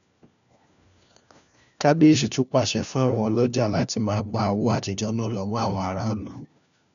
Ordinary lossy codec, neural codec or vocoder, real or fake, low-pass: none; codec, 16 kHz, 1 kbps, FunCodec, trained on LibriTTS, 50 frames a second; fake; 7.2 kHz